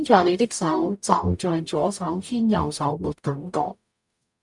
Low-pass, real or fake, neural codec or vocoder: 10.8 kHz; fake; codec, 44.1 kHz, 0.9 kbps, DAC